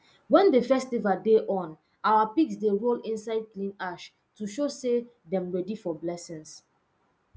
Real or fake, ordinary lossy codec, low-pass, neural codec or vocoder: real; none; none; none